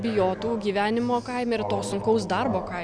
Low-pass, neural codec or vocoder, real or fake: 9.9 kHz; none; real